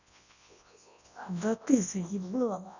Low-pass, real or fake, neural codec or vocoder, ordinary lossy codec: 7.2 kHz; fake; codec, 24 kHz, 0.9 kbps, WavTokenizer, large speech release; none